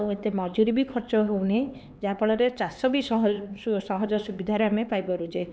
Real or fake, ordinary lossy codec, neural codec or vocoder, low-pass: fake; none; codec, 16 kHz, 4 kbps, X-Codec, HuBERT features, trained on LibriSpeech; none